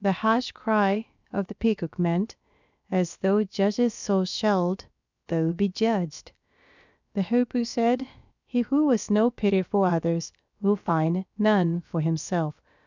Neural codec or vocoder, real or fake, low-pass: codec, 16 kHz, about 1 kbps, DyCAST, with the encoder's durations; fake; 7.2 kHz